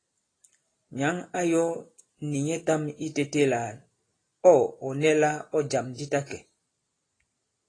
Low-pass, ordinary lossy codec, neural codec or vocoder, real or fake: 9.9 kHz; AAC, 32 kbps; none; real